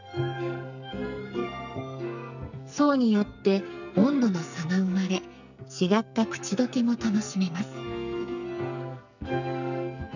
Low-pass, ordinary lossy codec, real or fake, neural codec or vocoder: 7.2 kHz; none; fake; codec, 44.1 kHz, 2.6 kbps, SNAC